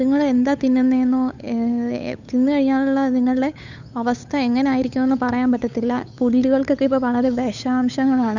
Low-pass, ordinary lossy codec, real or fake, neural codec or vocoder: 7.2 kHz; none; fake; codec, 16 kHz, 8 kbps, FunCodec, trained on Chinese and English, 25 frames a second